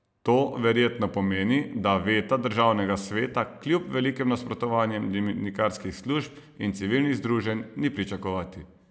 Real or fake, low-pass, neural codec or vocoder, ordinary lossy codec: real; none; none; none